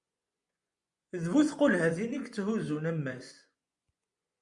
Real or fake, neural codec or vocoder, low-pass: fake; vocoder, 24 kHz, 100 mel bands, Vocos; 10.8 kHz